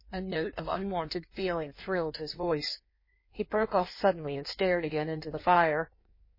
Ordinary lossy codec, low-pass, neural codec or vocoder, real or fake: MP3, 24 kbps; 5.4 kHz; codec, 16 kHz in and 24 kHz out, 1.1 kbps, FireRedTTS-2 codec; fake